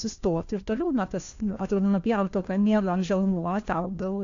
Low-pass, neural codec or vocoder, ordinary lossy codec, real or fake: 7.2 kHz; codec, 16 kHz, 1 kbps, FunCodec, trained on LibriTTS, 50 frames a second; AAC, 48 kbps; fake